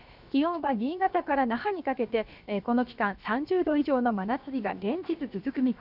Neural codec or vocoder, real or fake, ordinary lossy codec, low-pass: codec, 16 kHz, about 1 kbps, DyCAST, with the encoder's durations; fake; none; 5.4 kHz